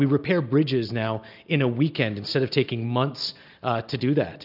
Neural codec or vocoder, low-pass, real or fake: none; 5.4 kHz; real